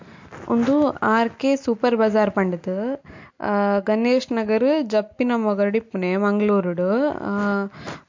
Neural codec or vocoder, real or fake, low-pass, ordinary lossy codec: none; real; 7.2 kHz; MP3, 48 kbps